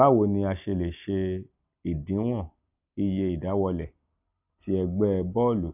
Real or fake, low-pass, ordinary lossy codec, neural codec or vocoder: real; 3.6 kHz; none; none